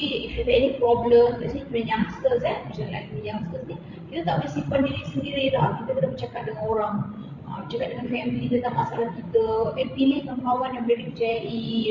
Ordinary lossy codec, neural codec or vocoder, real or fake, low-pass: none; codec, 16 kHz, 16 kbps, FreqCodec, larger model; fake; 7.2 kHz